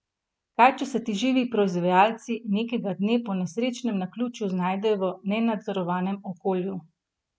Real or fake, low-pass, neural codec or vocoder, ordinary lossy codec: real; none; none; none